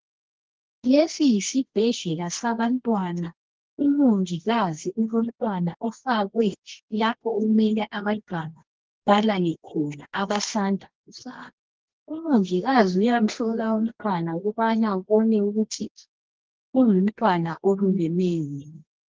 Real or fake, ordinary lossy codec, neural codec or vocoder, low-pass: fake; Opus, 16 kbps; codec, 24 kHz, 0.9 kbps, WavTokenizer, medium music audio release; 7.2 kHz